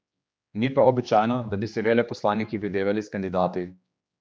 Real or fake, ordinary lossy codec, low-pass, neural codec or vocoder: fake; none; none; codec, 16 kHz, 2 kbps, X-Codec, HuBERT features, trained on general audio